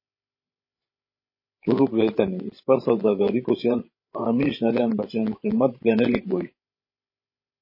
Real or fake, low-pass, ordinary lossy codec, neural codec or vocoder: fake; 5.4 kHz; MP3, 24 kbps; codec, 16 kHz, 16 kbps, FreqCodec, larger model